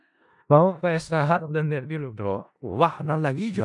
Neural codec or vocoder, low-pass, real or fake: codec, 16 kHz in and 24 kHz out, 0.4 kbps, LongCat-Audio-Codec, four codebook decoder; 10.8 kHz; fake